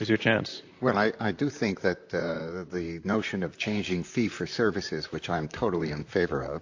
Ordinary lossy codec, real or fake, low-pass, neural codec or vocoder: AAC, 48 kbps; fake; 7.2 kHz; vocoder, 44.1 kHz, 128 mel bands, Pupu-Vocoder